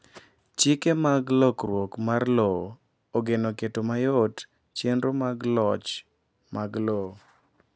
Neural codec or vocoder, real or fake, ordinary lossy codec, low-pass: none; real; none; none